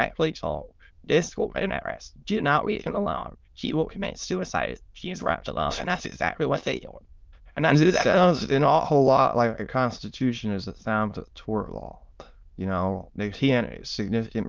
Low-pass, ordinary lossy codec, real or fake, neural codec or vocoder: 7.2 kHz; Opus, 32 kbps; fake; autoencoder, 22.05 kHz, a latent of 192 numbers a frame, VITS, trained on many speakers